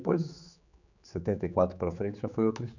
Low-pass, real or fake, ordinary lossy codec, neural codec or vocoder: 7.2 kHz; fake; none; codec, 16 kHz, 4 kbps, X-Codec, HuBERT features, trained on general audio